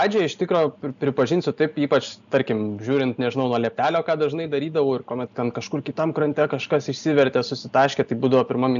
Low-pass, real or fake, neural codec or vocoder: 7.2 kHz; real; none